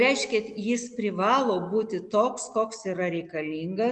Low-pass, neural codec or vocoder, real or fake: 10.8 kHz; none; real